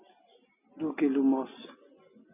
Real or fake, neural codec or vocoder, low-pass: real; none; 3.6 kHz